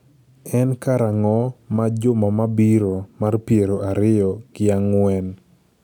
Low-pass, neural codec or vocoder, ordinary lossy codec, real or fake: 19.8 kHz; none; none; real